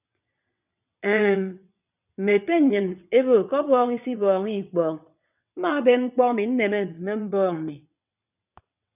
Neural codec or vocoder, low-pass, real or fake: vocoder, 22.05 kHz, 80 mel bands, WaveNeXt; 3.6 kHz; fake